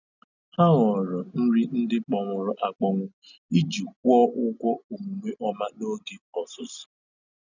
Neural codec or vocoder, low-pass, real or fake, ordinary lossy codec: none; 7.2 kHz; real; none